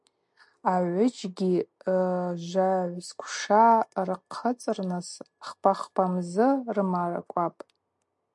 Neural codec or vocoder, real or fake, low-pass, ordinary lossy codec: none; real; 10.8 kHz; MP3, 64 kbps